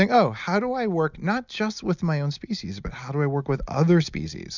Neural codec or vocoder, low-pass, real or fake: none; 7.2 kHz; real